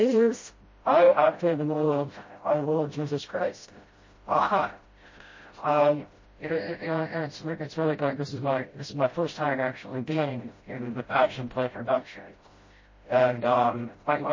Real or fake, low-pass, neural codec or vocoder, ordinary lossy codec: fake; 7.2 kHz; codec, 16 kHz, 0.5 kbps, FreqCodec, smaller model; MP3, 32 kbps